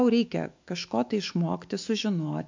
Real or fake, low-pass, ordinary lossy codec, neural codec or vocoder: fake; 7.2 kHz; MP3, 64 kbps; autoencoder, 48 kHz, 128 numbers a frame, DAC-VAE, trained on Japanese speech